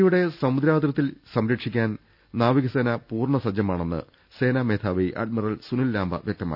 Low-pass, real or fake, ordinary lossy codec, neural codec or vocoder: 5.4 kHz; real; none; none